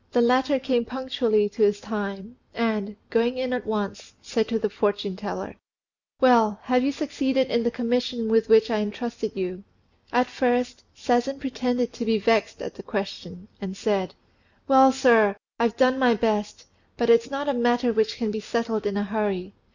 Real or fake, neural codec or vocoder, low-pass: real; none; 7.2 kHz